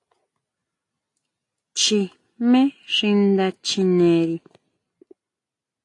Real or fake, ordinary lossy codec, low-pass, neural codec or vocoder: real; AAC, 48 kbps; 10.8 kHz; none